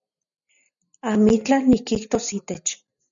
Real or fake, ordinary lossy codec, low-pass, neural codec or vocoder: real; MP3, 96 kbps; 7.2 kHz; none